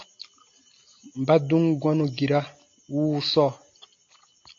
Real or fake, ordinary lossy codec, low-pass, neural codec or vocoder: real; AAC, 48 kbps; 7.2 kHz; none